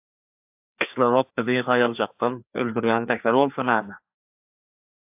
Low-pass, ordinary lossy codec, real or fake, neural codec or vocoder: 3.6 kHz; AAC, 32 kbps; fake; codec, 16 kHz, 2 kbps, FreqCodec, larger model